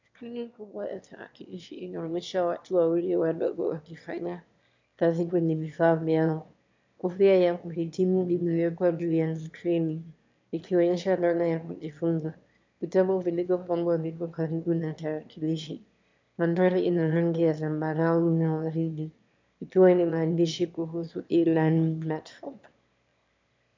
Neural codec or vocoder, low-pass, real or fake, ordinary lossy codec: autoencoder, 22.05 kHz, a latent of 192 numbers a frame, VITS, trained on one speaker; 7.2 kHz; fake; MP3, 64 kbps